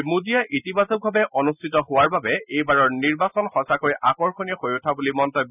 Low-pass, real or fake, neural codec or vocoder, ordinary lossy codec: 3.6 kHz; real; none; none